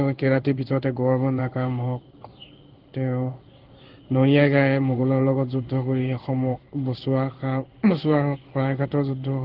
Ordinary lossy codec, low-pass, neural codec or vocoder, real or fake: Opus, 16 kbps; 5.4 kHz; codec, 16 kHz in and 24 kHz out, 1 kbps, XY-Tokenizer; fake